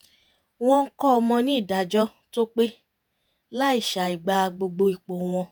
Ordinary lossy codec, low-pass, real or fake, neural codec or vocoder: none; none; fake; vocoder, 48 kHz, 128 mel bands, Vocos